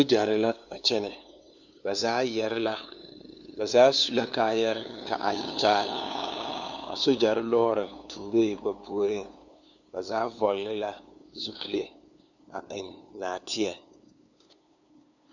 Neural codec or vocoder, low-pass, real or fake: codec, 16 kHz, 2 kbps, FunCodec, trained on LibriTTS, 25 frames a second; 7.2 kHz; fake